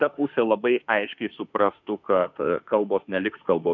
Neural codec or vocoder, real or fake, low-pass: autoencoder, 48 kHz, 32 numbers a frame, DAC-VAE, trained on Japanese speech; fake; 7.2 kHz